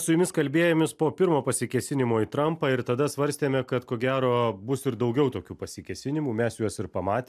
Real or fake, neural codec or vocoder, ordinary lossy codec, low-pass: real; none; AAC, 96 kbps; 14.4 kHz